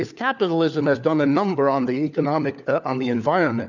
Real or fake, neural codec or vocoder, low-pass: fake; codec, 16 kHz, 4 kbps, FunCodec, trained on LibriTTS, 50 frames a second; 7.2 kHz